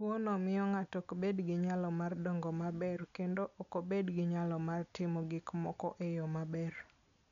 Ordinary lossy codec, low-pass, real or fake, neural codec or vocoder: none; 7.2 kHz; real; none